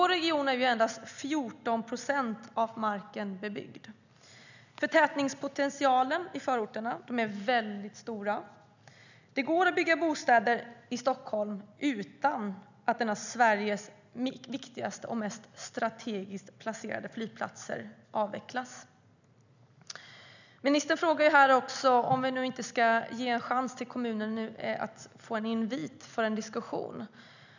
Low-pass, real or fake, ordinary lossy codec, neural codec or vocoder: 7.2 kHz; real; none; none